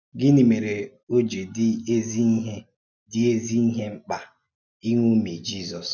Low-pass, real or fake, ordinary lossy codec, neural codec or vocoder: 7.2 kHz; real; none; none